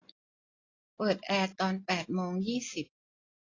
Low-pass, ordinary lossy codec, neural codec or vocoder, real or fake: 7.2 kHz; AAC, 32 kbps; none; real